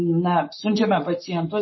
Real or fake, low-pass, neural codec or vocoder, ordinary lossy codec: real; 7.2 kHz; none; MP3, 24 kbps